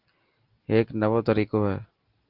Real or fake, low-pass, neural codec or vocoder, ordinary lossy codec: real; 5.4 kHz; none; Opus, 32 kbps